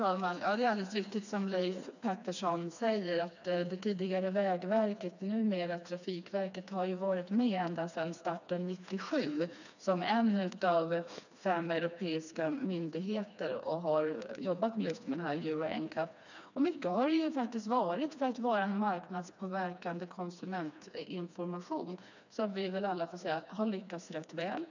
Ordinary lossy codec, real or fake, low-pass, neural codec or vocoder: none; fake; 7.2 kHz; codec, 16 kHz, 2 kbps, FreqCodec, smaller model